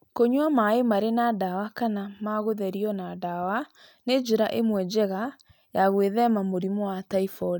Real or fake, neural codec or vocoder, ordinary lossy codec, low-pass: real; none; none; none